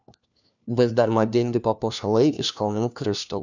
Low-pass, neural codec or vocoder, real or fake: 7.2 kHz; codec, 16 kHz, 1 kbps, FunCodec, trained on LibriTTS, 50 frames a second; fake